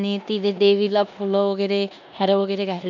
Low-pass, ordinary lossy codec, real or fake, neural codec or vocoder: 7.2 kHz; none; fake; codec, 16 kHz in and 24 kHz out, 0.9 kbps, LongCat-Audio-Codec, four codebook decoder